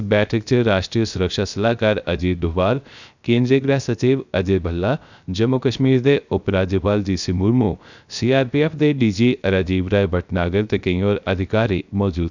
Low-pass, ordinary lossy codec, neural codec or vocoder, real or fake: 7.2 kHz; none; codec, 16 kHz, 0.3 kbps, FocalCodec; fake